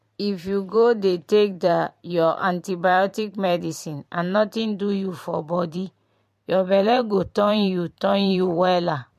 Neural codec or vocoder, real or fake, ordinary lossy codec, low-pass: vocoder, 44.1 kHz, 128 mel bands every 512 samples, BigVGAN v2; fake; MP3, 64 kbps; 14.4 kHz